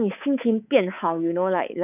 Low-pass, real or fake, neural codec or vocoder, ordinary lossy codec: 3.6 kHz; fake; codec, 24 kHz, 3.1 kbps, DualCodec; none